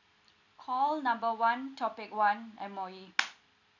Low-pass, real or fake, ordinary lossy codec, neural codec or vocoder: 7.2 kHz; real; none; none